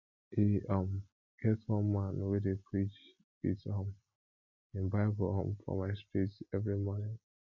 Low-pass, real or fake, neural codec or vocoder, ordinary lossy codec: 7.2 kHz; real; none; MP3, 48 kbps